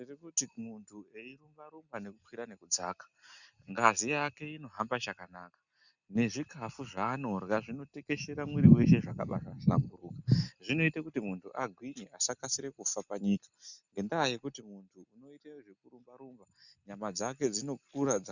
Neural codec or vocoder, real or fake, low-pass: none; real; 7.2 kHz